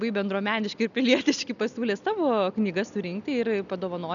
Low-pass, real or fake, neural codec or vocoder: 7.2 kHz; real; none